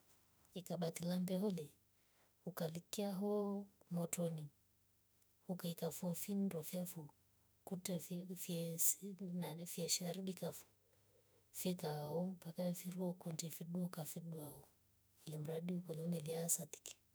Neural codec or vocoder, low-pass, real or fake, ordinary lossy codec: autoencoder, 48 kHz, 32 numbers a frame, DAC-VAE, trained on Japanese speech; none; fake; none